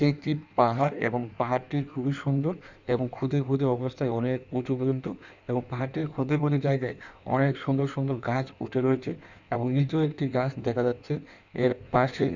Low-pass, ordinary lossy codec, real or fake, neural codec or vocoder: 7.2 kHz; none; fake; codec, 16 kHz in and 24 kHz out, 1.1 kbps, FireRedTTS-2 codec